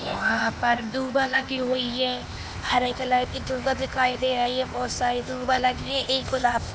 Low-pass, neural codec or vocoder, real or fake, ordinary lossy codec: none; codec, 16 kHz, 0.8 kbps, ZipCodec; fake; none